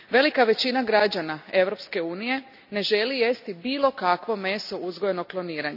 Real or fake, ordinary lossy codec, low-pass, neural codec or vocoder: real; none; 5.4 kHz; none